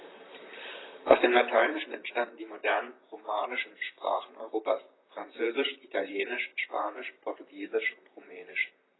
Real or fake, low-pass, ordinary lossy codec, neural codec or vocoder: fake; 7.2 kHz; AAC, 16 kbps; vocoder, 44.1 kHz, 128 mel bands, Pupu-Vocoder